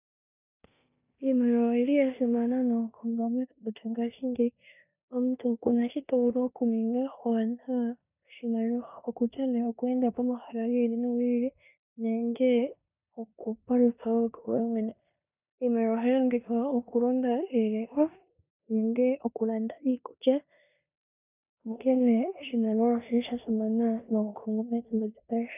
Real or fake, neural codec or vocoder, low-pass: fake; codec, 16 kHz in and 24 kHz out, 0.9 kbps, LongCat-Audio-Codec, four codebook decoder; 3.6 kHz